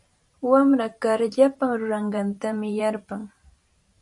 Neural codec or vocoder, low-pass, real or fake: vocoder, 44.1 kHz, 128 mel bands every 512 samples, BigVGAN v2; 10.8 kHz; fake